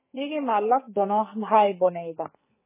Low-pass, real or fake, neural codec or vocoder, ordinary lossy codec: 3.6 kHz; fake; codec, 44.1 kHz, 2.6 kbps, SNAC; MP3, 16 kbps